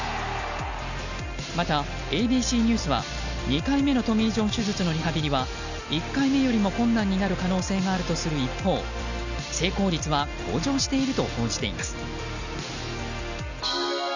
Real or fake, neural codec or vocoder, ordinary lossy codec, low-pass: real; none; none; 7.2 kHz